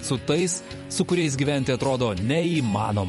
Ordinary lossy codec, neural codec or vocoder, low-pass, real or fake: MP3, 48 kbps; vocoder, 44.1 kHz, 128 mel bands every 512 samples, BigVGAN v2; 19.8 kHz; fake